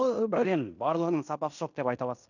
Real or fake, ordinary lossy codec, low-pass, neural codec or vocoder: fake; none; 7.2 kHz; codec, 16 kHz in and 24 kHz out, 0.9 kbps, LongCat-Audio-Codec, fine tuned four codebook decoder